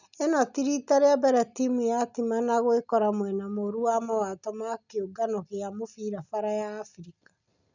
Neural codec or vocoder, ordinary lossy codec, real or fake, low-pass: none; none; real; 7.2 kHz